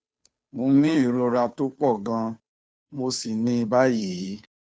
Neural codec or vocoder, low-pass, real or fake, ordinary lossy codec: codec, 16 kHz, 2 kbps, FunCodec, trained on Chinese and English, 25 frames a second; none; fake; none